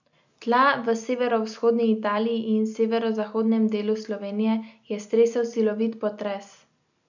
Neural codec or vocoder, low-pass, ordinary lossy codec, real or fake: none; 7.2 kHz; none; real